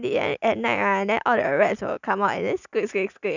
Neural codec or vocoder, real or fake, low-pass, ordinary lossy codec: none; real; 7.2 kHz; none